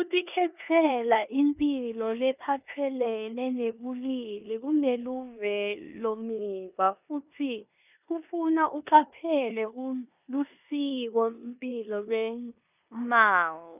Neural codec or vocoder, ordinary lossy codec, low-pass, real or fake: codec, 16 kHz in and 24 kHz out, 0.9 kbps, LongCat-Audio-Codec, four codebook decoder; none; 3.6 kHz; fake